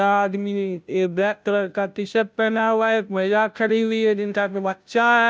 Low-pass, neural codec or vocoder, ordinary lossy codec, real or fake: none; codec, 16 kHz, 0.5 kbps, FunCodec, trained on Chinese and English, 25 frames a second; none; fake